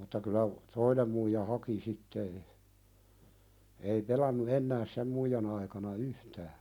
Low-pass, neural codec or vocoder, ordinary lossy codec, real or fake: 19.8 kHz; none; none; real